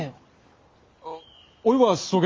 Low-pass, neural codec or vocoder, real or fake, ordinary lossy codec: 7.2 kHz; none; real; Opus, 32 kbps